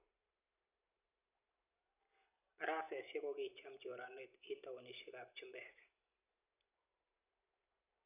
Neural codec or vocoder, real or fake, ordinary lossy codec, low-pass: none; real; none; 3.6 kHz